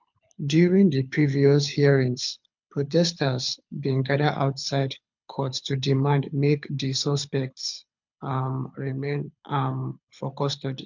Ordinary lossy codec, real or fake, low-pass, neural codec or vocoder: MP3, 64 kbps; fake; 7.2 kHz; codec, 24 kHz, 6 kbps, HILCodec